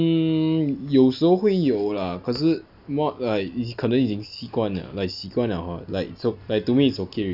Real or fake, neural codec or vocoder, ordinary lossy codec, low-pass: real; none; none; 5.4 kHz